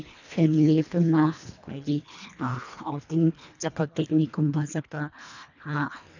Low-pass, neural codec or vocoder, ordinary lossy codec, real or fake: 7.2 kHz; codec, 24 kHz, 1.5 kbps, HILCodec; none; fake